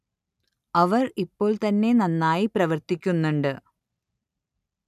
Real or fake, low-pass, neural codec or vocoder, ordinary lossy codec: real; 14.4 kHz; none; none